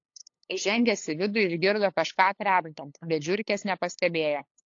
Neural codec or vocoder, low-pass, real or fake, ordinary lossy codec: codec, 16 kHz, 8 kbps, FunCodec, trained on LibriTTS, 25 frames a second; 7.2 kHz; fake; AAC, 48 kbps